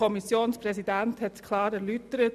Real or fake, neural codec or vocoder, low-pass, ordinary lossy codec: real; none; 14.4 kHz; none